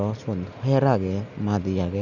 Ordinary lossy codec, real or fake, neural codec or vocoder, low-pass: none; real; none; 7.2 kHz